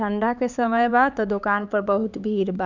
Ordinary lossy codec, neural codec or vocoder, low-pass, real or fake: none; codec, 16 kHz, 4 kbps, X-Codec, HuBERT features, trained on LibriSpeech; 7.2 kHz; fake